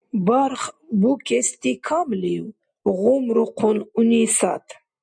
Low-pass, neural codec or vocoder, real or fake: 9.9 kHz; none; real